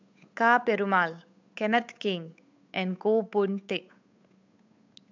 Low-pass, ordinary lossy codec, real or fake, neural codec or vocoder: 7.2 kHz; MP3, 64 kbps; fake; codec, 16 kHz, 8 kbps, FunCodec, trained on Chinese and English, 25 frames a second